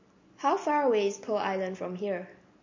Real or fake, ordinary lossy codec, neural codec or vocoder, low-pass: real; MP3, 32 kbps; none; 7.2 kHz